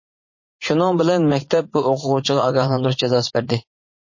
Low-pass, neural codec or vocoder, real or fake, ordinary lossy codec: 7.2 kHz; vocoder, 44.1 kHz, 80 mel bands, Vocos; fake; MP3, 32 kbps